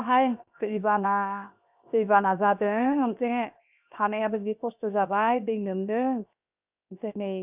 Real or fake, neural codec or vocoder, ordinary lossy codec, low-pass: fake; codec, 16 kHz, 0.7 kbps, FocalCodec; none; 3.6 kHz